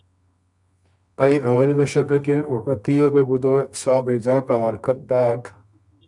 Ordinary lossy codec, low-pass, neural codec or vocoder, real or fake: MP3, 96 kbps; 10.8 kHz; codec, 24 kHz, 0.9 kbps, WavTokenizer, medium music audio release; fake